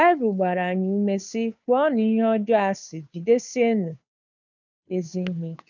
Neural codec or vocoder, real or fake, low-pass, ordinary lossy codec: codec, 16 kHz, 2 kbps, FunCodec, trained on Chinese and English, 25 frames a second; fake; 7.2 kHz; none